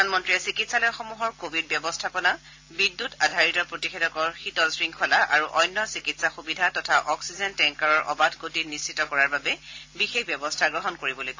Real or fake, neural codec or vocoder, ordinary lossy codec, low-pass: real; none; AAC, 48 kbps; 7.2 kHz